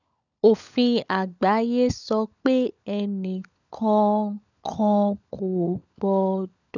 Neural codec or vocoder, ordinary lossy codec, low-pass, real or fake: codec, 16 kHz, 16 kbps, FunCodec, trained on LibriTTS, 50 frames a second; none; 7.2 kHz; fake